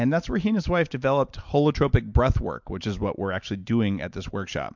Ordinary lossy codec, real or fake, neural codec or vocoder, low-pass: MP3, 64 kbps; real; none; 7.2 kHz